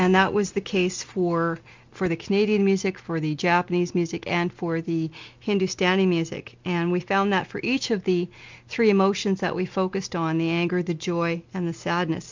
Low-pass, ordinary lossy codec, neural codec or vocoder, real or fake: 7.2 kHz; MP3, 64 kbps; none; real